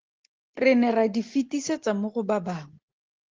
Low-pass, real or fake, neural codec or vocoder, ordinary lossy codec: 7.2 kHz; real; none; Opus, 16 kbps